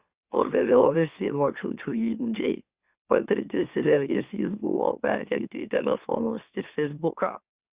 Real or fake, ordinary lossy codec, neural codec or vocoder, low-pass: fake; Opus, 64 kbps; autoencoder, 44.1 kHz, a latent of 192 numbers a frame, MeloTTS; 3.6 kHz